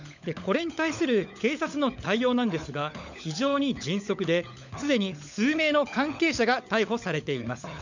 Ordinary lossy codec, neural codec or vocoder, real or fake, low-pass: none; codec, 16 kHz, 16 kbps, FunCodec, trained on LibriTTS, 50 frames a second; fake; 7.2 kHz